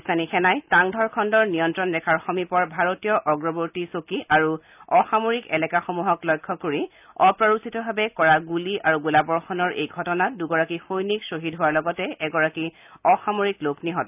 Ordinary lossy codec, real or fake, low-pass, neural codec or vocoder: none; real; 3.6 kHz; none